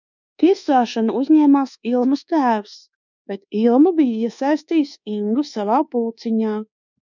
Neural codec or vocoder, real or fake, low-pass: codec, 24 kHz, 1.2 kbps, DualCodec; fake; 7.2 kHz